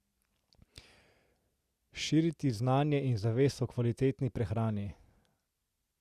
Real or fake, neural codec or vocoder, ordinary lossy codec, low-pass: real; none; Opus, 64 kbps; 14.4 kHz